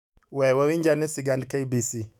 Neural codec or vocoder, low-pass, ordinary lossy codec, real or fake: autoencoder, 48 kHz, 128 numbers a frame, DAC-VAE, trained on Japanese speech; 19.8 kHz; none; fake